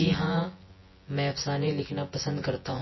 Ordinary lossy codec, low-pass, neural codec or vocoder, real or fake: MP3, 24 kbps; 7.2 kHz; vocoder, 24 kHz, 100 mel bands, Vocos; fake